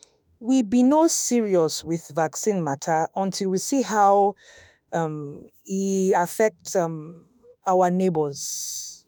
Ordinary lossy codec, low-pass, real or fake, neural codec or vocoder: none; none; fake; autoencoder, 48 kHz, 32 numbers a frame, DAC-VAE, trained on Japanese speech